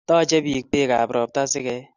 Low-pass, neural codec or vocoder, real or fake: 7.2 kHz; none; real